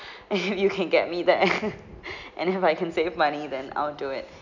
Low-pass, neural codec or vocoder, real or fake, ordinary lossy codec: 7.2 kHz; none; real; none